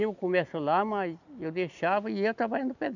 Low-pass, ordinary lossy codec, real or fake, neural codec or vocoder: 7.2 kHz; none; real; none